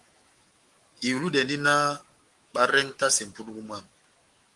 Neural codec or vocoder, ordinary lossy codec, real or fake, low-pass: autoencoder, 48 kHz, 128 numbers a frame, DAC-VAE, trained on Japanese speech; Opus, 24 kbps; fake; 10.8 kHz